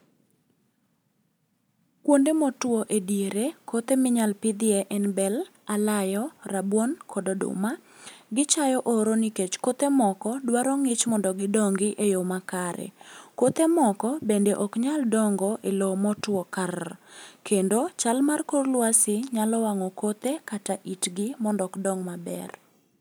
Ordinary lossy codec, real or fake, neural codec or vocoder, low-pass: none; real; none; none